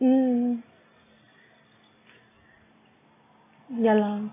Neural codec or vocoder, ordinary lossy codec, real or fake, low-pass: none; AAC, 16 kbps; real; 3.6 kHz